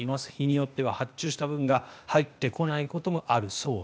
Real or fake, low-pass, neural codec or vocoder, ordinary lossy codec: fake; none; codec, 16 kHz, 0.8 kbps, ZipCodec; none